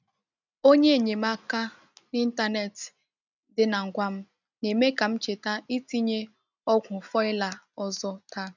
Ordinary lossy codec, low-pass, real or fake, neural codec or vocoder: none; 7.2 kHz; real; none